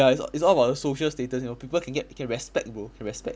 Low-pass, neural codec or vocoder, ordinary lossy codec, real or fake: none; none; none; real